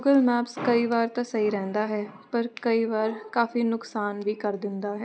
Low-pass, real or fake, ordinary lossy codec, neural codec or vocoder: none; real; none; none